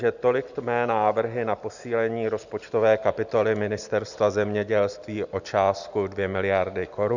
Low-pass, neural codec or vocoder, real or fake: 7.2 kHz; none; real